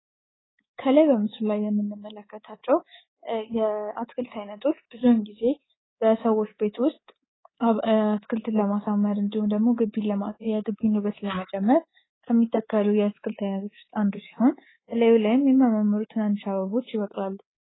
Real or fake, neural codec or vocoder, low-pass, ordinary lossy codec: fake; codec, 24 kHz, 3.1 kbps, DualCodec; 7.2 kHz; AAC, 16 kbps